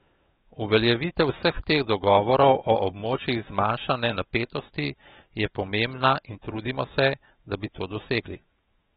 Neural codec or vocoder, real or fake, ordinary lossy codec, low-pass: autoencoder, 48 kHz, 128 numbers a frame, DAC-VAE, trained on Japanese speech; fake; AAC, 16 kbps; 19.8 kHz